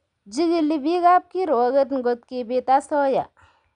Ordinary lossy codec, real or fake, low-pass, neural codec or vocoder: none; real; 9.9 kHz; none